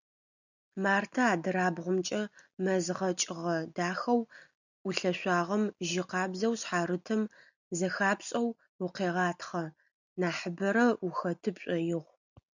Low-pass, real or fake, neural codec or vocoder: 7.2 kHz; real; none